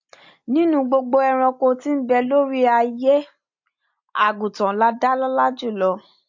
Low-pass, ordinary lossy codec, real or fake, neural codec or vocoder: 7.2 kHz; MP3, 48 kbps; real; none